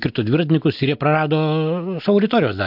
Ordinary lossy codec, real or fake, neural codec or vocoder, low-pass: MP3, 48 kbps; real; none; 5.4 kHz